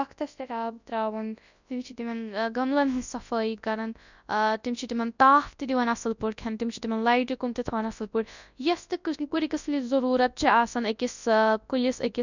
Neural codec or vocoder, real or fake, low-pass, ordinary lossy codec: codec, 24 kHz, 0.9 kbps, WavTokenizer, large speech release; fake; 7.2 kHz; none